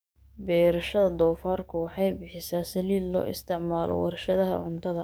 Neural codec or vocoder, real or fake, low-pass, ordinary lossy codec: codec, 44.1 kHz, 7.8 kbps, DAC; fake; none; none